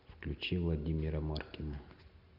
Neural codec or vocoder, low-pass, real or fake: none; 5.4 kHz; real